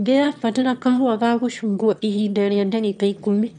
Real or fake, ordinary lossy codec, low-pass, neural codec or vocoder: fake; none; 9.9 kHz; autoencoder, 22.05 kHz, a latent of 192 numbers a frame, VITS, trained on one speaker